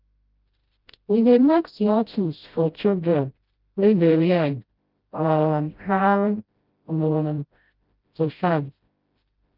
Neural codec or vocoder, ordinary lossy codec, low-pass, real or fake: codec, 16 kHz, 0.5 kbps, FreqCodec, smaller model; Opus, 24 kbps; 5.4 kHz; fake